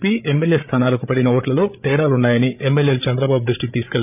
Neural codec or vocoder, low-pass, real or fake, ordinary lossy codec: codec, 16 kHz, 8 kbps, FreqCodec, larger model; 3.6 kHz; fake; none